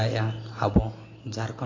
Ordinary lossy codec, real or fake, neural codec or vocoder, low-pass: AAC, 32 kbps; real; none; 7.2 kHz